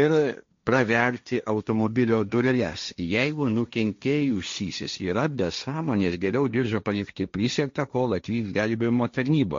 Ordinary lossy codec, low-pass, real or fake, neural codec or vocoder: MP3, 48 kbps; 7.2 kHz; fake; codec, 16 kHz, 1.1 kbps, Voila-Tokenizer